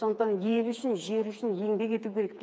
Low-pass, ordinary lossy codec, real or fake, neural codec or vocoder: none; none; fake; codec, 16 kHz, 4 kbps, FreqCodec, smaller model